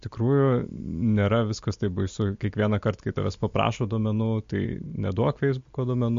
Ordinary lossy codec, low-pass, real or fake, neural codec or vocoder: MP3, 48 kbps; 7.2 kHz; real; none